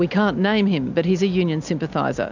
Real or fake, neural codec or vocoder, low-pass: real; none; 7.2 kHz